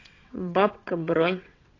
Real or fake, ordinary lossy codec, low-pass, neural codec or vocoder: fake; AAC, 32 kbps; 7.2 kHz; vocoder, 22.05 kHz, 80 mel bands, WaveNeXt